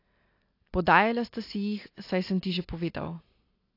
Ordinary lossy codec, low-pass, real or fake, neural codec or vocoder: AAC, 32 kbps; 5.4 kHz; real; none